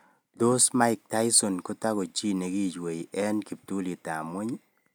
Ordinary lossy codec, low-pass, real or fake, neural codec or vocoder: none; none; real; none